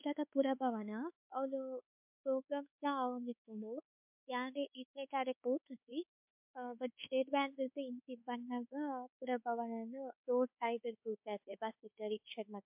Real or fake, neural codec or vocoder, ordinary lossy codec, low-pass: fake; codec, 24 kHz, 1.2 kbps, DualCodec; MP3, 32 kbps; 3.6 kHz